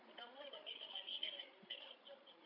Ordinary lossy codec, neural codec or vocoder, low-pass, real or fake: none; codec, 16 kHz, 16 kbps, FreqCodec, larger model; 5.4 kHz; fake